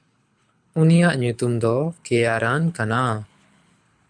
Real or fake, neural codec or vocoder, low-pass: fake; codec, 24 kHz, 6 kbps, HILCodec; 9.9 kHz